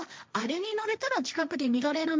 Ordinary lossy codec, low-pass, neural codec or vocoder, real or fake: none; none; codec, 16 kHz, 1.1 kbps, Voila-Tokenizer; fake